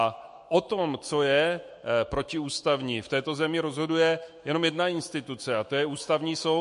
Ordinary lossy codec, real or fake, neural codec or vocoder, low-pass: MP3, 48 kbps; fake; autoencoder, 48 kHz, 128 numbers a frame, DAC-VAE, trained on Japanese speech; 14.4 kHz